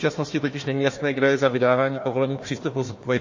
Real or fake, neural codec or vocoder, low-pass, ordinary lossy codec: fake; codec, 16 kHz, 1 kbps, FunCodec, trained on Chinese and English, 50 frames a second; 7.2 kHz; MP3, 32 kbps